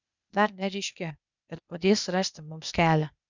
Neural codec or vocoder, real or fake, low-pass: codec, 16 kHz, 0.8 kbps, ZipCodec; fake; 7.2 kHz